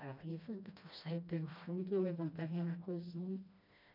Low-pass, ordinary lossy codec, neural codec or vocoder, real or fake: 5.4 kHz; MP3, 48 kbps; codec, 16 kHz, 1 kbps, FreqCodec, smaller model; fake